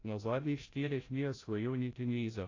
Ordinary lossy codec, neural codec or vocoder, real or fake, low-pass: AAC, 32 kbps; codec, 16 kHz, 0.5 kbps, FreqCodec, larger model; fake; 7.2 kHz